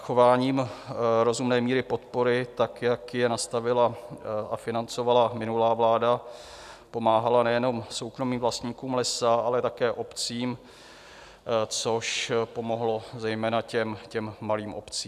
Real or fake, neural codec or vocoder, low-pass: real; none; 14.4 kHz